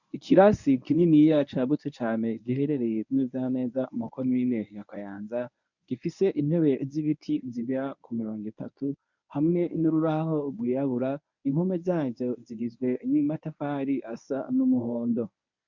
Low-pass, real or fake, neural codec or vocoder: 7.2 kHz; fake; codec, 24 kHz, 0.9 kbps, WavTokenizer, medium speech release version 1